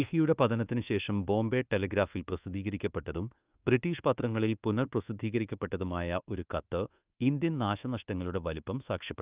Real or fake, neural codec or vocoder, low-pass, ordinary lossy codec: fake; codec, 24 kHz, 1.2 kbps, DualCodec; 3.6 kHz; Opus, 32 kbps